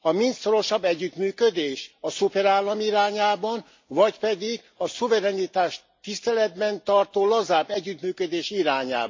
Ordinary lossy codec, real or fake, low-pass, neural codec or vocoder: MP3, 48 kbps; real; 7.2 kHz; none